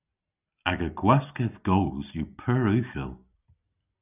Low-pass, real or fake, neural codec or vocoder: 3.6 kHz; real; none